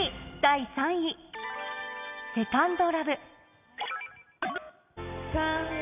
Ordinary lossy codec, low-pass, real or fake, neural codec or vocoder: none; 3.6 kHz; real; none